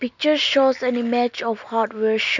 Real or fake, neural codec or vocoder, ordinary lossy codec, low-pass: real; none; none; 7.2 kHz